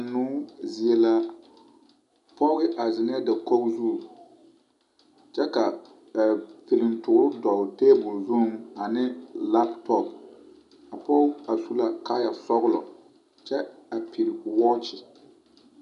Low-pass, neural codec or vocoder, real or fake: 10.8 kHz; none; real